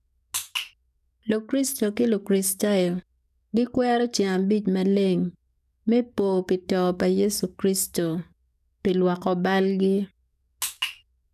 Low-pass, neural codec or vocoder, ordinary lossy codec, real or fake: 14.4 kHz; codec, 44.1 kHz, 7.8 kbps, DAC; none; fake